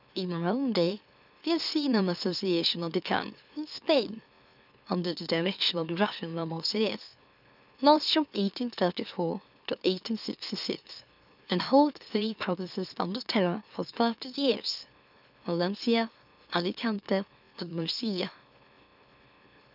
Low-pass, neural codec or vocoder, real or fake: 5.4 kHz; autoencoder, 44.1 kHz, a latent of 192 numbers a frame, MeloTTS; fake